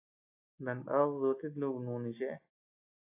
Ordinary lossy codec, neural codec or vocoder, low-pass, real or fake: AAC, 32 kbps; none; 3.6 kHz; real